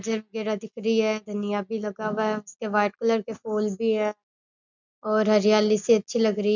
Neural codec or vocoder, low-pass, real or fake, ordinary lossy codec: none; 7.2 kHz; real; none